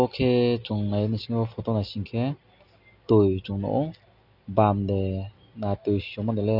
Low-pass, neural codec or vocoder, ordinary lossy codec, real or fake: 5.4 kHz; none; none; real